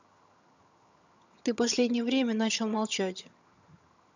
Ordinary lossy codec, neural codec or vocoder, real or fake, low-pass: none; vocoder, 22.05 kHz, 80 mel bands, HiFi-GAN; fake; 7.2 kHz